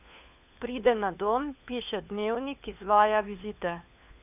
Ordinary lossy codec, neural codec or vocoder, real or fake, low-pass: none; codec, 16 kHz, 4 kbps, FunCodec, trained on LibriTTS, 50 frames a second; fake; 3.6 kHz